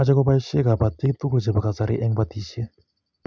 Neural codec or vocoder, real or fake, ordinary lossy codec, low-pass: none; real; none; none